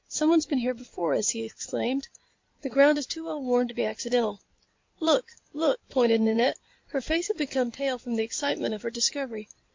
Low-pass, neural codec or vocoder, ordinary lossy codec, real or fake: 7.2 kHz; codec, 16 kHz in and 24 kHz out, 2.2 kbps, FireRedTTS-2 codec; MP3, 48 kbps; fake